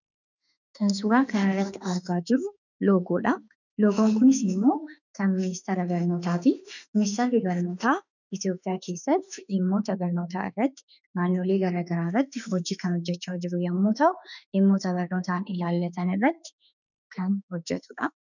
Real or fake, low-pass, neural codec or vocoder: fake; 7.2 kHz; autoencoder, 48 kHz, 32 numbers a frame, DAC-VAE, trained on Japanese speech